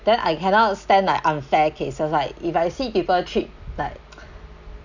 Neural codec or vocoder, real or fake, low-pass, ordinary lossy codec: none; real; 7.2 kHz; none